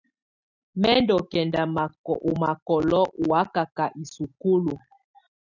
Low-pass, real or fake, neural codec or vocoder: 7.2 kHz; real; none